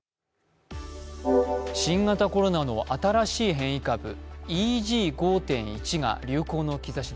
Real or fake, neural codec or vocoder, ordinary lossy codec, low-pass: real; none; none; none